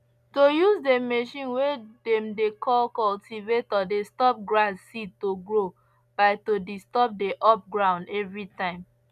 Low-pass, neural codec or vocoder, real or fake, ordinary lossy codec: 14.4 kHz; none; real; none